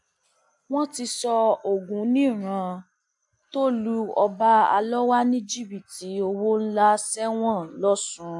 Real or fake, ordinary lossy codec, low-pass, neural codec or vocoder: real; AAC, 64 kbps; 10.8 kHz; none